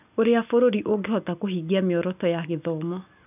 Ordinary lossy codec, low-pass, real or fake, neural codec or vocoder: none; 3.6 kHz; real; none